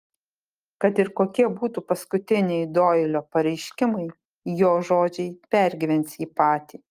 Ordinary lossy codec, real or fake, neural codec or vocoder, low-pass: Opus, 32 kbps; real; none; 14.4 kHz